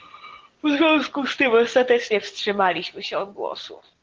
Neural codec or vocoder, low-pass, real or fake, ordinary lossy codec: none; 7.2 kHz; real; Opus, 16 kbps